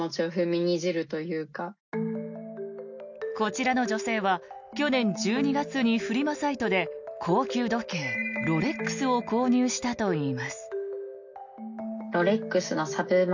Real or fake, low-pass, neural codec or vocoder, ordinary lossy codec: real; 7.2 kHz; none; none